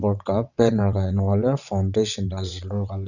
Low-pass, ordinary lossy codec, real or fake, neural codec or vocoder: 7.2 kHz; none; fake; vocoder, 22.05 kHz, 80 mel bands, WaveNeXt